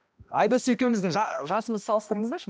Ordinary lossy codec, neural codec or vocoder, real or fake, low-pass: none; codec, 16 kHz, 1 kbps, X-Codec, HuBERT features, trained on balanced general audio; fake; none